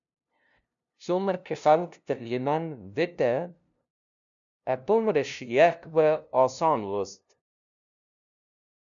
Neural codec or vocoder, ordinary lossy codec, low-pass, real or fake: codec, 16 kHz, 0.5 kbps, FunCodec, trained on LibriTTS, 25 frames a second; MP3, 96 kbps; 7.2 kHz; fake